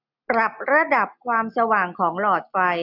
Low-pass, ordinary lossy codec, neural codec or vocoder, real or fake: 5.4 kHz; none; none; real